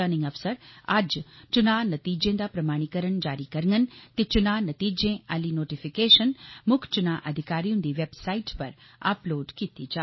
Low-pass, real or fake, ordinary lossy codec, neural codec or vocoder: 7.2 kHz; real; MP3, 24 kbps; none